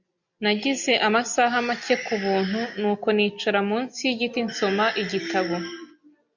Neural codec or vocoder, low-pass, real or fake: none; 7.2 kHz; real